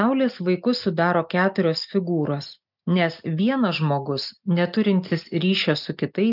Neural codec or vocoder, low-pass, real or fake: none; 5.4 kHz; real